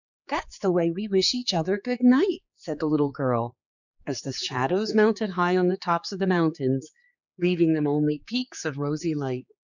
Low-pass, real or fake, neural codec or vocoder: 7.2 kHz; fake; codec, 16 kHz, 4 kbps, X-Codec, HuBERT features, trained on general audio